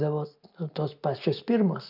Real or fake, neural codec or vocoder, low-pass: real; none; 5.4 kHz